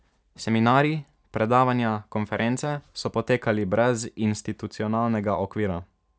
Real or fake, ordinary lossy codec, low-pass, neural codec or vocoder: real; none; none; none